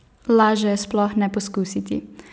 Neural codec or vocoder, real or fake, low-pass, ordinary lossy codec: none; real; none; none